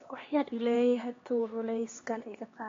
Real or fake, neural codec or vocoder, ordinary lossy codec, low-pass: fake; codec, 16 kHz, 2 kbps, X-Codec, HuBERT features, trained on LibriSpeech; AAC, 32 kbps; 7.2 kHz